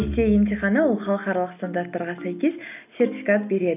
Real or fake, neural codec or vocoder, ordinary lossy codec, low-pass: real; none; none; 3.6 kHz